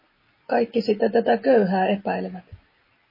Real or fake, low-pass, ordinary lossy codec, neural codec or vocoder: real; 5.4 kHz; MP3, 24 kbps; none